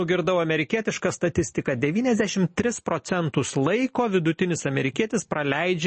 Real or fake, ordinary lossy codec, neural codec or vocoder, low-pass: real; MP3, 32 kbps; none; 10.8 kHz